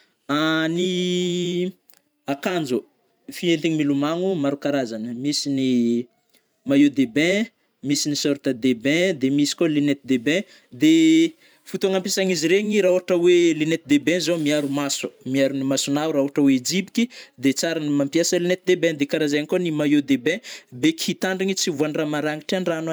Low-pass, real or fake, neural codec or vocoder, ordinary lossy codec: none; fake; vocoder, 44.1 kHz, 128 mel bands every 512 samples, BigVGAN v2; none